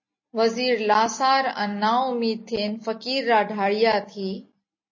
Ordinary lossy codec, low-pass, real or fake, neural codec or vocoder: MP3, 32 kbps; 7.2 kHz; real; none